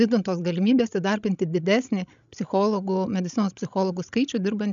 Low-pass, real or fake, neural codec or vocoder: 7.2 kHz; fake; codec, 16 kHz, 16 kbps, FreqCodec, larger model